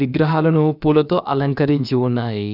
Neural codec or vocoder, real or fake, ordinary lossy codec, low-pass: codec, 16 kHz, about 1 kbps, DyCAST, with the encoder's durations; fake; none; 5.4 kHz